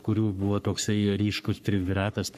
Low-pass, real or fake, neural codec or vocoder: 14.4 kHz; fake; codec, 44.1 kHz, 3.4 kbps, Pupu-Codec